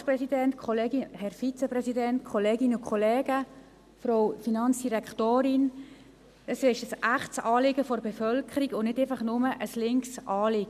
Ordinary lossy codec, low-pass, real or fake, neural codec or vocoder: none; 14.4 kHz; real; none